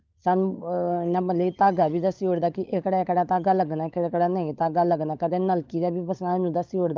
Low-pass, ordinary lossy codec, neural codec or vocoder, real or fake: 7.2 kHz; Opus, 32 kbps; codec, 16 kHz, 16 kbps, FunCodec, trained on LibriTTS, 50 frames a second; fake